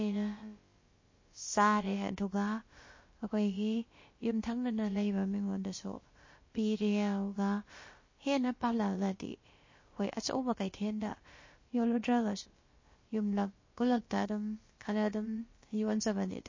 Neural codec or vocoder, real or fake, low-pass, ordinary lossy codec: codec, 16 kHz, about 1 kbps, DyCAST, with the encoder's durations; fake; 7.2 kHz; MP3, 32 kbps